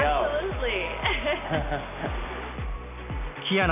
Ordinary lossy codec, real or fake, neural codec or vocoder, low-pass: none; real; none; 3.6 kHz